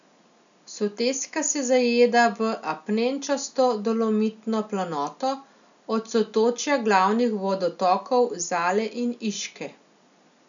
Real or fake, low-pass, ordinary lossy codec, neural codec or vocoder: real; 7.2 kHz; none; none